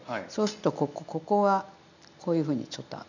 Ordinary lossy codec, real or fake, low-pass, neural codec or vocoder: none; real; 7.2 kHz; none